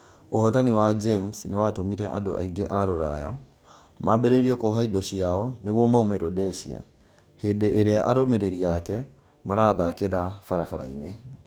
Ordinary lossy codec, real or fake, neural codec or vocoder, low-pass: none; fake; codec, 44.1 kHz, 2.6 kbps, DAC; none